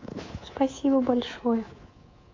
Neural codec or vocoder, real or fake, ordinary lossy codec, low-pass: codec, 24 kHz, 3.1 kbps, DualCodec; fake; AAC, 32 kbps; 7.2 kHz